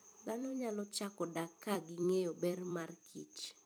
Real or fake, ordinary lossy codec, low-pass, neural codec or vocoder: fake; none; none; vocoder, 44.1 kHz, 128 mel bands every 256 samples, BigVGAN v2